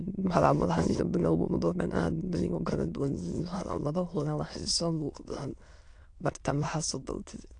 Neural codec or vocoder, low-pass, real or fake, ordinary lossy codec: autoencoder, 22.05 kHz, a latent of 192 numbers a frame, VITS, trained on many speakers; 9.9 kHz; fake; AAC, 48 kbps